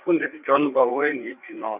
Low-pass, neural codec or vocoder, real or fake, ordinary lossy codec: 3.6 kHz; codec, 16 kHz, 4 kbps, FreqCodec, larger model; fake; none